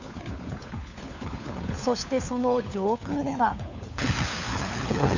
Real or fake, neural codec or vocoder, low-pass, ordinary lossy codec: fake; codec, 16 kHz, 4 kbps, FunCodec, trained on LibriTTS, 50 frames a second; 7.2 kHz; none